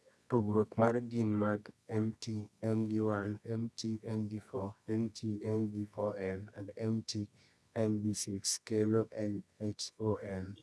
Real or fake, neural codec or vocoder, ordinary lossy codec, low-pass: fake; codec, 24 kHz, 0.9 kbps, WavTokenizer, medium music audio release; none; none